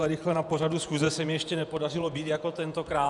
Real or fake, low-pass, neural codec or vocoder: fake; 10.8 kHz; vocoder, 48 kHz, 128 mel bands, Vocos